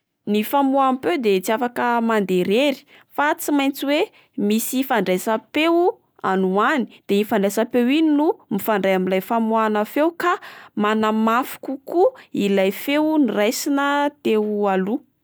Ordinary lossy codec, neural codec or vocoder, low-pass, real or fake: none; none; none; real